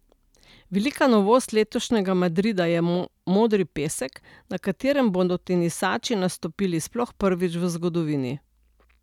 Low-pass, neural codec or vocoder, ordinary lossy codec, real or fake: 19.8 kHz; none; none; real